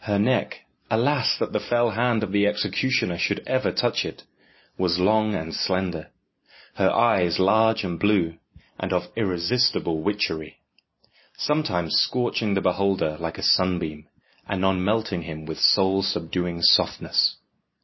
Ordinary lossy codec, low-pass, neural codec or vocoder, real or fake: MP3, 24 kbps; 7.2 kHz; none; real